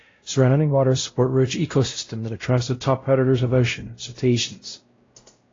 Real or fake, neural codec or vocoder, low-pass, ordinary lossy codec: fake; codec, 16 kHz, 0.5 kbps, X-Codec, WavLM features, trained on Multilingual LibriSpeech; 7.2 kHz; AAC, 32 kbps